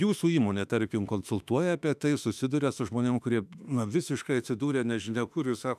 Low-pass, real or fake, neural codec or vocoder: 14.4 kHz; fake; autoencoder, 48 kHz, 32 numbers a frame, DAC-VAE, trained on Japanese speech